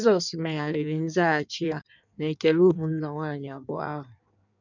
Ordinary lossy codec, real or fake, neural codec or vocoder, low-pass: none; fake; codec, 16 kHz in and 24 kHz out, 1.1 kbps, FireRedTTS-2 codec; 7.2 kHz